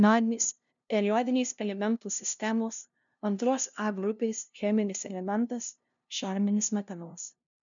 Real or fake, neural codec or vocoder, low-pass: fake; codec, 16 kHz, 0.5 kbps, FunCodec, trained on LibriTTS, 25 frames a second; 7.2 kHz